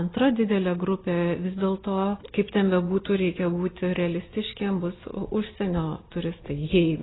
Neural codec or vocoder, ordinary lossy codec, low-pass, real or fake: none; AAC, 16 kbps; 7.2 kHz; real